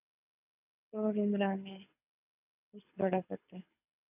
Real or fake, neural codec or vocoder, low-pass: fake; codec, 24 kHz, 6 kbps, HILCodec; 3.6 kHz